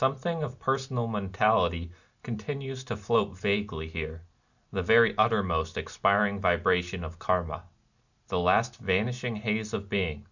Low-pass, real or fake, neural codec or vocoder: 7.2 kHz; real; none